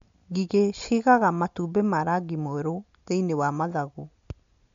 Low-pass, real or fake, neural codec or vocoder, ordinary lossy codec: 7.2 kHz; real; none; MP3, 48 kbps